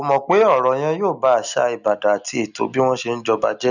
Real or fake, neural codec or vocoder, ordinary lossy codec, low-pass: real; none; none; 7.2 kHz